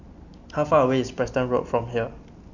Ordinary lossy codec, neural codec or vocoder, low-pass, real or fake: none; none; 7.2 kHz; real